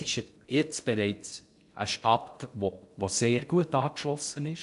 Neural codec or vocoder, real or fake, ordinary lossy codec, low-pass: codec, 16 kHz in and 24 kHz out, 0.6 kbps, FocalCodec, streaming, 2048 codes; fake; MP3, 96 kbps; 10.8 kHz